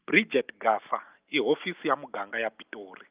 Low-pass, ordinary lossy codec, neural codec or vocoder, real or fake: 3.6 kHz; Opus, 32 kbps; none; real